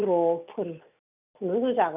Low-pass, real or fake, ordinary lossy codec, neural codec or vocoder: 3.6 kHz; real; none; none